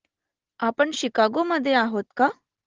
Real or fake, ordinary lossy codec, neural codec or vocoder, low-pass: real; Opus, 16 kbps; none; 7.2 kHz